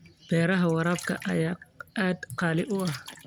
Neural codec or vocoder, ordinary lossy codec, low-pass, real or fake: none; none; none; real